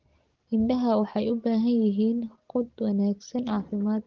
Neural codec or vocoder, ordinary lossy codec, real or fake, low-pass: none; Opus, 16 kbps; real; 7.2 kHz